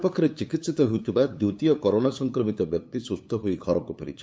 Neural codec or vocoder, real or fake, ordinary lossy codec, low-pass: codec, 16 kHz, 8 kbps, FunCodec, trained on LibriTTS, 25 frames a second; fake; none; none